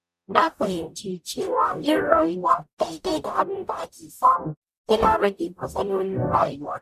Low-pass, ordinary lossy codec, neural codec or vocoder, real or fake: 14.4 kHz; none; codec, 44.1 kHz, 0.9 kbps, DAC; fake